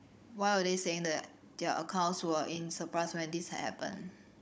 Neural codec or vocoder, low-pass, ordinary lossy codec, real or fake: codec, 16 kHz, 16 kbps, FunCodec, trained on Chinese and English, 50 frames a second; none; none; fake